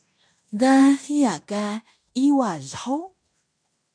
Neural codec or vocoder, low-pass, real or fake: codec, 16 kHz in and 24 kHz out, 0.9 kbps, LongCat-Audio-Codec, fine tuned four codebook decoder; 9.9 kHz; fake